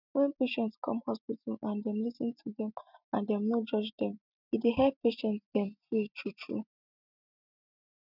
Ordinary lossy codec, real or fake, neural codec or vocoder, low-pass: none; real; none; 5.4 kHz